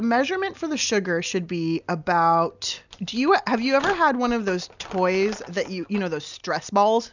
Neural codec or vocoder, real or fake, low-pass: none; real; 7.2 kHz